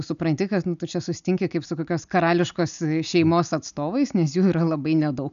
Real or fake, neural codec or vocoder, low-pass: real; none; 7.2 kHz